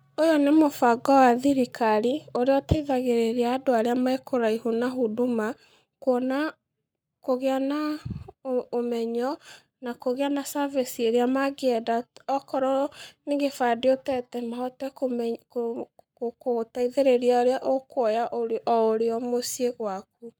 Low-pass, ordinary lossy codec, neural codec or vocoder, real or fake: none; none; codec, 44.1 kHz, 7.8 kbps, Pupu-Codec; fake